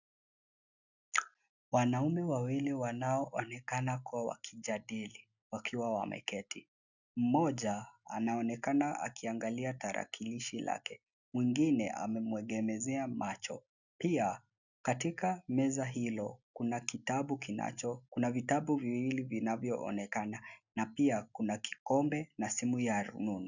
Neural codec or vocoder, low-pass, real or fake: none; 7.2 kHz; real